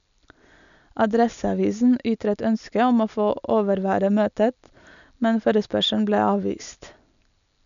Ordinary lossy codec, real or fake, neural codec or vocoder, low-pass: none; real; none; 7.2 kHz